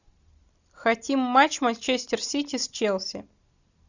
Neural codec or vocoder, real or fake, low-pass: none; real; 7.2 kHz